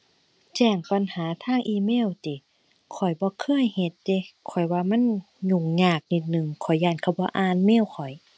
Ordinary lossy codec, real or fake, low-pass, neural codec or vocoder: none; real; none; none